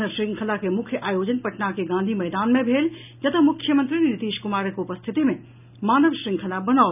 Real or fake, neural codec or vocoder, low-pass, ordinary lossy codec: real; none; 3.6 kHz; none